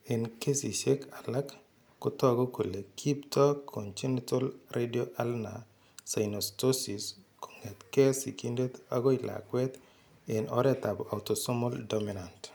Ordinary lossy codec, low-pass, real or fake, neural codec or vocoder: none; none; real; none